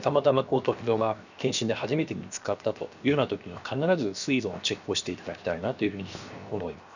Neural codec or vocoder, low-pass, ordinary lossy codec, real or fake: codec, 16 kHz, 0.7 kbps, FocalCodec; 7.2 kHz; none; fake